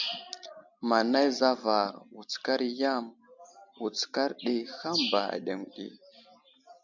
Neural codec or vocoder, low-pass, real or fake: none; 7.2 kHz; real